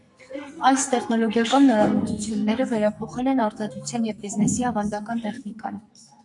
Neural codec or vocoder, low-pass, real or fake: codec, 44.1 kHz, 2.6 kbps, SNAC; 10.8 kHz; fake